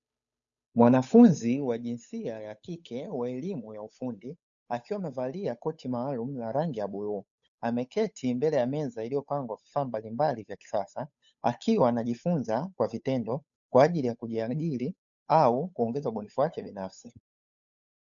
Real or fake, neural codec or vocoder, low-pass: fake; codec, 16 kHz, 8 kbps, FunCodec, trained on Chinese and English, 25 frames a second; 7.2 kHz